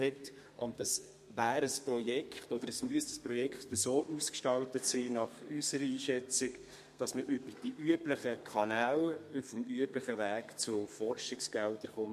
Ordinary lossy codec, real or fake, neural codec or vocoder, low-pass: MP3, 64 kbps; fake; codec, 32 kHz, 1.9 kbps, SNAC; 14.4 kHz